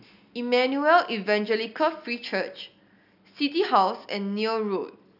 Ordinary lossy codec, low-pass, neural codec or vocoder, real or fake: none; 5.4 kHz; none; real